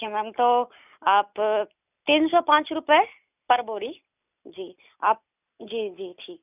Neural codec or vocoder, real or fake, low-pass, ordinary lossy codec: none; real; 3.6 kHz; none